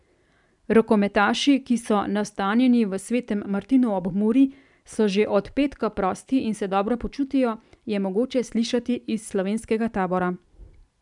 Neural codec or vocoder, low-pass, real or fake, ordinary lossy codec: none; 10.8 kHz; real; none